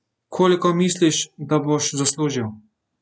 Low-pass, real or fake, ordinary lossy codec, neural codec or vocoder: none; real; none; none